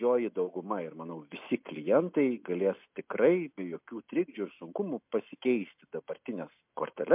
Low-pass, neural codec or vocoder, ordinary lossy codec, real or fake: 3.6 kHz; none; MP3, 32 kbps; real